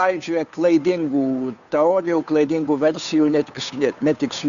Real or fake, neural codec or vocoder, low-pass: fake; codec, 16 kHz, 2 kbps, FunCodec, trained on Chinese and English, 25 frames a second; 7.2 kHz